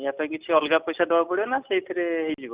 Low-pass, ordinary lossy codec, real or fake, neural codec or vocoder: 3.6 kHz; Opus, 64 kbps; real; none